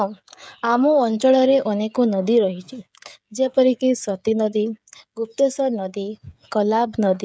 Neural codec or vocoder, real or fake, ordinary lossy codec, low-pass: codec, 16 kHz, 16 kbps, FreqCodec, smaller model; fake; none; none